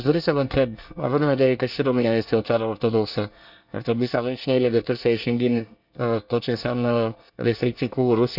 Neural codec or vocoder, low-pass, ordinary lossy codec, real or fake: codec, 24 kHz, 1 kbps, SNAC; 5.4 kHz; none; fake